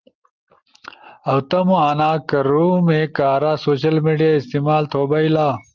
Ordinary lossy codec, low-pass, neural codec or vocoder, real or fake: Opus, 24 kbps; 7.2 kHz; none; real